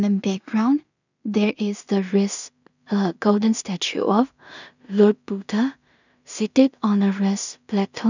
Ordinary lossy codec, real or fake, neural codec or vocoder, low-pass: none; fake; codec, 16 kHz in and 24 kHz out, 0.4 kbps, LongCat-Audio-Codec, two codebook decoder; 7.2 kHz